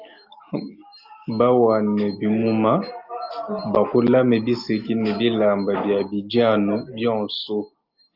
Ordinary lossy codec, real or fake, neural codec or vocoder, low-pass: Opus, 24 kbps; real; none; 5.4 kHz